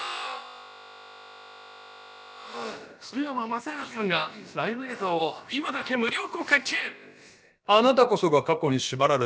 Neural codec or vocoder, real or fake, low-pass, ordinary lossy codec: codec, 16 kHz, about 1 kbps, DyCAST, with the encoder's durations; fake; none; none